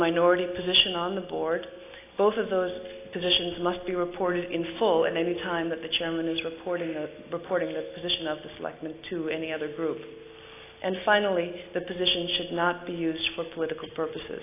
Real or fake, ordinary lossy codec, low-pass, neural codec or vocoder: real; AAC, 24 kbps; 3.6 kHz; none